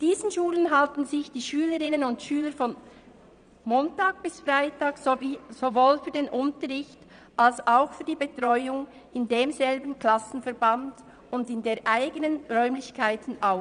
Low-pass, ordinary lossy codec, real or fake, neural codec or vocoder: 9.9 kHz; none; fake; vocoder, 22.05 kHz, 80 mel bands, Vocos